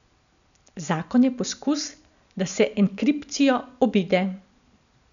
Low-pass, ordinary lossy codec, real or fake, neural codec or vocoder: 7.2 kHz; none; real; none